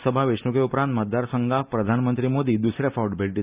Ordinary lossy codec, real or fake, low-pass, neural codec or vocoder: Opus, 64 kbps; real; 3.6 kHz; none